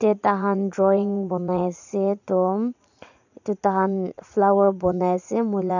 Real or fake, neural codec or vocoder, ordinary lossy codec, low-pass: fake; vocoder, 44.1 kHz, 80 mel bands, Vocos; none; 7.2 kHz